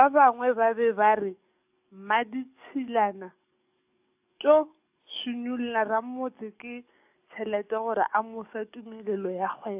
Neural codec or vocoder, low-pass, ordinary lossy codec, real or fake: codec, 44.1 kHz, 7.8 kbps, DAC; 3.6 kHz; AAC, 32 kbps; fake